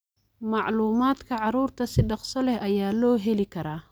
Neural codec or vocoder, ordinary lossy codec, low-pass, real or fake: none; none; none; real